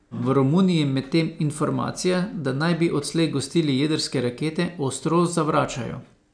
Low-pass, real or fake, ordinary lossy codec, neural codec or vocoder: 9.9 kHz; real; none; none